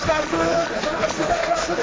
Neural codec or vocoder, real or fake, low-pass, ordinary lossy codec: codec, 16 kHz, 1.1 kbps, Voila-Tokenizer; fake; none; none